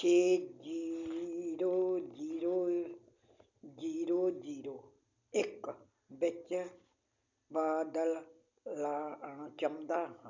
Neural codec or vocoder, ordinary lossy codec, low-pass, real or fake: none; none; 7.2 kHz; real